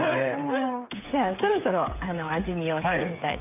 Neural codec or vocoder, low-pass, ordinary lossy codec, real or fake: codec, 16 kHz, 8 kbps, FreqCodec, smaller model; 3.6 kHz; none; fake